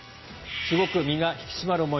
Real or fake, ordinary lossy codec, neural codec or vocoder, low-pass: real; MP3, 24 kbps; none; 7.2 kHz